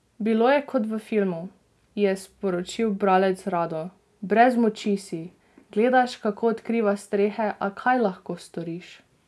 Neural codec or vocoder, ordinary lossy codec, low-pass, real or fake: none; none; none; real